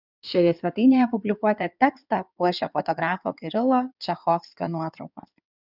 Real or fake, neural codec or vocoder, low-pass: fake; codec, 16 kHz in and 24 kHz out, 2.2 kbps, FireRedTTS-2 codec; 5.4 kHz